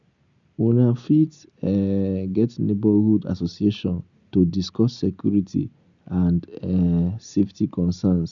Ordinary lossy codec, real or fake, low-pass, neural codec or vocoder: none; real; 7.2 kHz; none